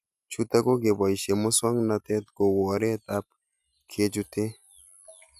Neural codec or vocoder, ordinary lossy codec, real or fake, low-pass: none; none; real; 14.4 kHz